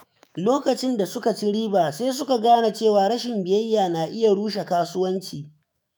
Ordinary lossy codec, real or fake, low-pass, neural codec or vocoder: none; fake; none; autoencoder, 48 kHz, 128 numbers a frame, DAC-VAE, trained on Japanese speech